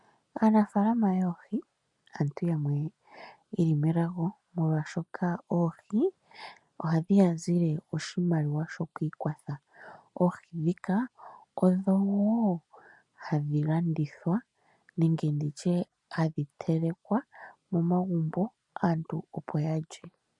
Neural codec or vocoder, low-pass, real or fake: none; 10.8 kHz; real